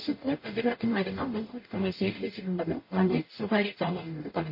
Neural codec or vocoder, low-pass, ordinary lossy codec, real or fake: codec, 44.1 kHz, 0.9 kbps, DAC; 5.4 kHz; MP3, 24 kbps; fake